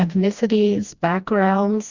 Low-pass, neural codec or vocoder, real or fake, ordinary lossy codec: 7.2 kHz; codec, 16 kHz, 1 kbps, FreqCodec, smaller model; fake; Opus, 64 kbps